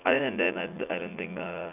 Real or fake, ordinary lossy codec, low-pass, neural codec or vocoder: fake; none; 3.6 kHz; vocoder, 44.1 kHz, 80 mel bands, Vocos